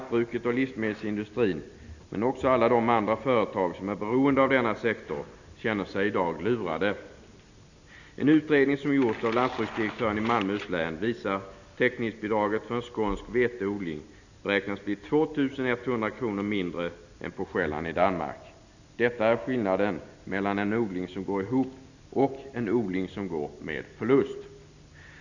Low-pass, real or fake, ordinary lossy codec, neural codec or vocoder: 7.2 kHz; real; none; none